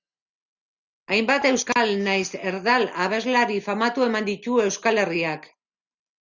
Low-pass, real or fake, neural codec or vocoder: 7.2 kHz; real; none